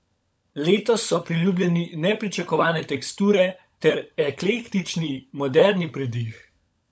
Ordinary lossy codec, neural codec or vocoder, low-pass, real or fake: none; codec, 16 kHz, 16 kbps, FunCodec, trained on LibriTTS, 50 frames a second; none; fake